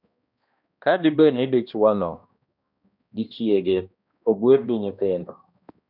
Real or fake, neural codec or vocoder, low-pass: fake; codec, 16 kHz, 1 kbps, X-Codec, HuBERT features, trained on balanced general audio; 5.4 kHz